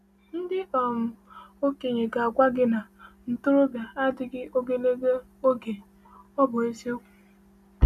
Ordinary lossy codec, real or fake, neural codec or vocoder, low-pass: none; real; none; 14.4 kHz